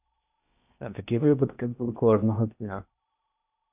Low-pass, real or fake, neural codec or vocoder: 3.6 kHz; fake; codec, 16 kHz in and 24 kHz out, 0.8 kbps, FocalCodec, streaming, 65536 codes